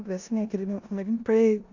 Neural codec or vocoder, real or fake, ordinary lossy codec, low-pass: codec, 16 kHz in and 24 kHz out, 0.9 kbps, LongCat-Audio-Codec, four codebook decoder; fake; AAC, 48 kbps; 7.2 kHz